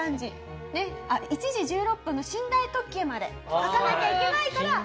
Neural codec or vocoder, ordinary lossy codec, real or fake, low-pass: none; none; real; none